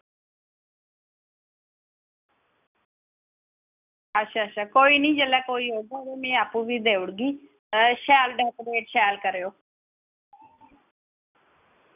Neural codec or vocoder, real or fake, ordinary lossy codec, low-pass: none; real; none; 3.6 kHz